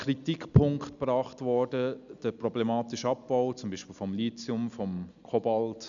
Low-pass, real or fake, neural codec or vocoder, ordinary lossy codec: 7.2 kHz; real; none; none